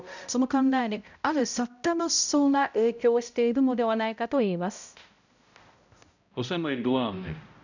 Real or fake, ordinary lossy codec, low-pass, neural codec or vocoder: fake; none; 7.2 kHz; codec, 16 kHz, 0.5 kbps, X-Codec, HuBERT features, trained on balanced general audio